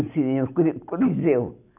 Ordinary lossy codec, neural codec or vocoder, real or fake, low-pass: none; none; real; 3.6 kHz